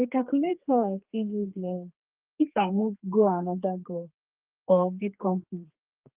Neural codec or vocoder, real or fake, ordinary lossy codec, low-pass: codec, 32 kHz, 1.9 kbps, SNAC; fake; Opus, 32 kbps; 3.6 kHz